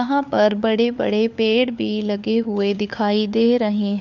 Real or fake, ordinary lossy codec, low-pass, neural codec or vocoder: fake; none; 7.2 kHz; codec, 16 kHz, 4 kbps, FunCodec, trained on LibriTTS, 50 frames a second